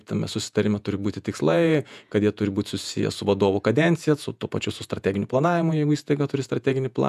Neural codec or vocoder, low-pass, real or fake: vocoder, 48 kHz, 128 mel bands, Vocos; 14.4 kHz; fake